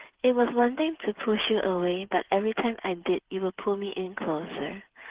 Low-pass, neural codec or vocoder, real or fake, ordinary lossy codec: 3.6 kHz; codec, 16 kHz, 8 kbps, FreqCodec, smaller model; fake; Opus, 16 kbps